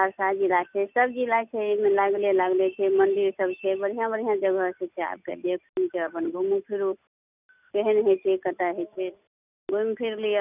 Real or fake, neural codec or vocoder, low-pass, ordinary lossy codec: real; none; 3.6 kHz; none